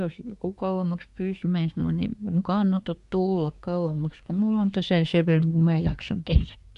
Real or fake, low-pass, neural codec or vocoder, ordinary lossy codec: fake; 10.8 kHz; codec, 24 kHz, 1 kbps, SNAC; none